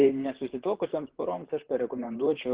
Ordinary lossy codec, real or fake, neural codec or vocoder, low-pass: Opus, 16 kbps; fake; vocoder, 44.1 kHz, 128 mel bands, Pupu-Vocoder; 3.6 kHz